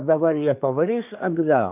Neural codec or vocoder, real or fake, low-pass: codec, 24 kHz, 1 kbps, SNAC; fake; 3.6 kHz